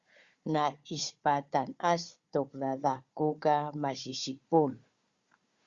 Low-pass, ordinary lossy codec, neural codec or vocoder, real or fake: 7.2 kHz; Opus, 64 kbps; codec, 16 kHz, 4 kbps, FunCodec, trained on Chinese and English, 50 frames a second; fake